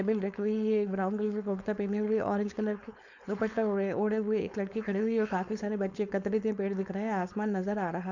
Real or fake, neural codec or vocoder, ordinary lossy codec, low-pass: fake; codec, 16 kHz, 4.8 kbps, FACodec; none; 7.2 kHz